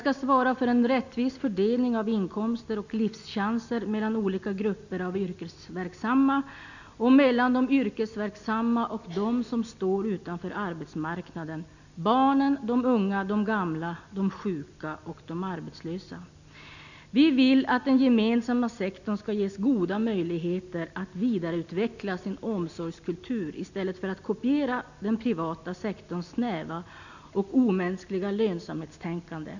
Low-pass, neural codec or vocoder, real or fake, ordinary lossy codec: 7.2 kHz; none; real; none